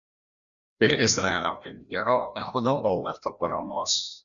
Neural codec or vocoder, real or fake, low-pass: codec, 16 kHz, 1 kbps, FreqCodec, larger model; fake; 7.2 kHz